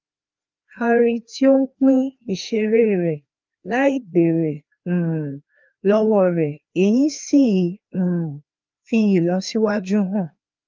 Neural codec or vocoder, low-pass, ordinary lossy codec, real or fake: codec, 16 kHz, 2 kbps, FreqCodec, larger model; 7.2 kHz; Opus, 24 kbps; fake